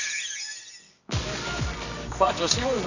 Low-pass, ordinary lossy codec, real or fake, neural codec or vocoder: 7.2 kHz; none; fake; codec, 24 kHz, 0.9 kbps, WavTokenizer, medium music audio release